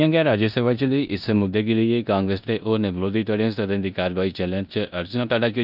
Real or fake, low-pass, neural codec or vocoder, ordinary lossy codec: fake; 5.4 kHz; codec, 16 kHz in and 24 kHz out, 0.9 kbps, LongCat-Audio-Codec, four codebook decoder; none